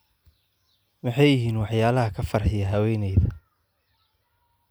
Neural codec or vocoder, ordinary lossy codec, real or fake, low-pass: none; none; real; none